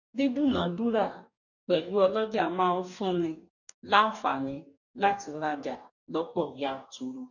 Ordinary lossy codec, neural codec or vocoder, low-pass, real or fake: none; codec, 44.1 kHz, 2.6 kbps, DAC; 7.2 kHz; fake